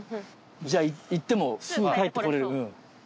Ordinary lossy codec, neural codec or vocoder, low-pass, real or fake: none; none; none; real